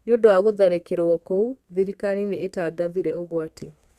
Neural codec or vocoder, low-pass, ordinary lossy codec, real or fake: codec, 32 kHz, 1.9 kbps, SNAC; 14.4 kHz; Opus, 64 kbps; fake